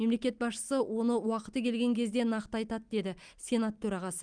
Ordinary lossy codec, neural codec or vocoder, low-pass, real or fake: Opus, 32 kbps; none; 9.9 kHz; real